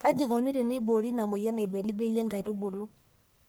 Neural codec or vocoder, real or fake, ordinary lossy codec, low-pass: codec, 44.1 kHz, 1.7 kbps, Pupu-Codec; fake; none; none